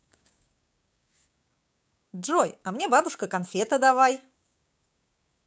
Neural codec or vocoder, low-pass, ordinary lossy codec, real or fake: codec, 16 kHz, 6 kbps, DAC; none; none; fake